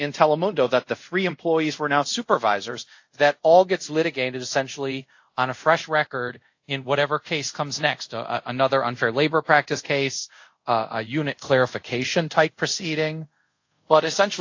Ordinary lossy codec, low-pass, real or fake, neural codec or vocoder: AAC, 48 kbps; 7.2 kHz; fake; codec, 24 kHz, 0.5 kbps, DualCodec